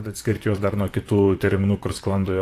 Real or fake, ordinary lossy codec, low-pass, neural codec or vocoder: fake; AAC, 64 kbps; 14.4 kHz; vocoder, 44.1 kHz, 128 mel bands, Pupu-Vocoder